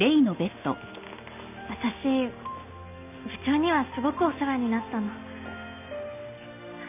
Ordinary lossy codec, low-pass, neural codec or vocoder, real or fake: none; 3.6 kHz; none; real